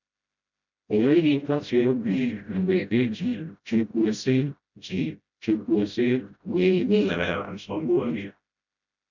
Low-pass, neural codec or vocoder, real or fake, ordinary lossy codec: 7.2 kHz; codec, 16 kHz, 0.5 kbps, FreqCodec, smaller model; fake; none